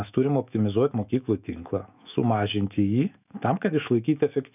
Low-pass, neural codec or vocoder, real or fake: 3.6 kHz; autoencoder, 48 kHz, 128 numbers a frame, DAC-VAE, trained on Japanese speech; fake